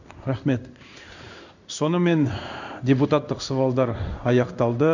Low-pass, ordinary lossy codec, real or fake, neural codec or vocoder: 7.2 kHz; none; fake; codec, 16 kHz in and 24 kHz out, 1 kbps, XY-Tokenizer